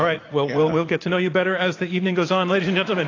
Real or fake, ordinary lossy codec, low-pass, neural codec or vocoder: fake; AAC, 32 kbps; 7.2 kHz; vocoder, 44.1 kHz, 80 mel bands, Vocos